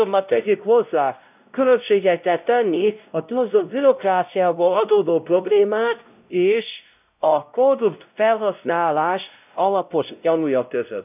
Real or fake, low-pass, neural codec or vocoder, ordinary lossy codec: fake; 3.6 kHz; codec, 16 kHz, 0.5 kbps, X-Codec, WavLM features, trained on Multilingual LibriSpeech; none